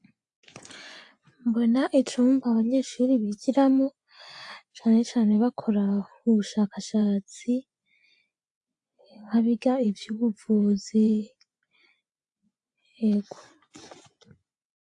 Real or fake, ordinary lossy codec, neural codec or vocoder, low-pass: fake; AAC, 64 kbps; vocoder, 24 kHz, 100 mel bands, Vocos; 10.8 kHz